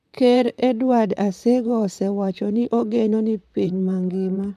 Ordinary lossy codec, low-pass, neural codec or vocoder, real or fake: MP3, 96 kbps; 14.4 kHz; vocoder, 44.1 kHz, 128 mel bands, Pupu-Vocoder; fake